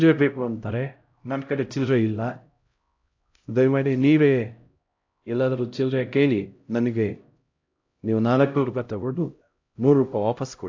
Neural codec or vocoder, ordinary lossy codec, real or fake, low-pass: codec, 16 kHz, 0.5 kbps, X-Codec, HuBERT features, trained on LibriSpeech; AAC, 48 kbps; fake; 7.2 kHz